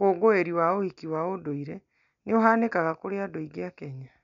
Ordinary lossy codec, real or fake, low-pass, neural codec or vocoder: none; real; 7.2 kHz; none